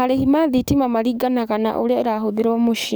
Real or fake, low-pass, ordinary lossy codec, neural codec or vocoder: fake; none; none; codec, 44.1 kHz, 7.8 kbps, DAC